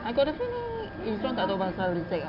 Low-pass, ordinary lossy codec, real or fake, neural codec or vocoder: 5.4 kHz; none; real; none